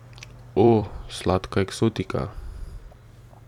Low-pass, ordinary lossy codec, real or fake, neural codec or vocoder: 19.8 kHz; none; fake; vocoder, 44.1 kHz, 128 mel bands every 256 samples, BigVGAN v2